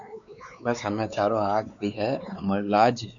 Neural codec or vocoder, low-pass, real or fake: codec, 16 kHz, 4 kbps, X-Codec, WavLM features, trained on Multilingual LibriSpeech; 7.2 kHz; fake